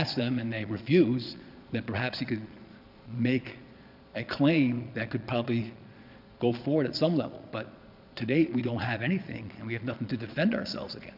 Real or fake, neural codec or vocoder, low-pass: fake; vocoder, 22.05 kHz, 80 mel bands, Vocos; 5.4 kHz